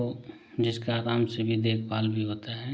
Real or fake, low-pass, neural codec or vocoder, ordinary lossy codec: real; none; none; none